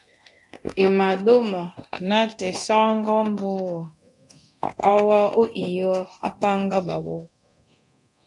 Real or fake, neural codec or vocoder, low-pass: fake; codec, 24 kHz, 0.9 kbps, DualCodec; 10.8 kHz